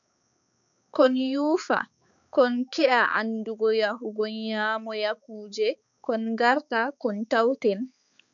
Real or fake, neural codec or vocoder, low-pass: fake; codec, 16 kHz, 4 kbps, X-Codec, HuBERT features, trained on balanced general audio; 7.2 kHz